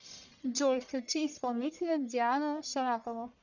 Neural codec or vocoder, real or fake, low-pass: codec, 44.1 kHz, 1.7 kbps, Pupu-Codec; fake; 7.2 kHz